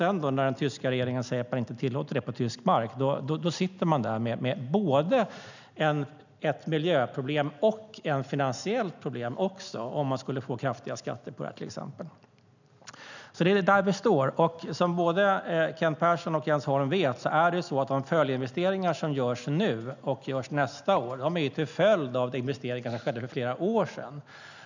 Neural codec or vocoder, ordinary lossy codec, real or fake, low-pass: none; none; real; 7.2 kHz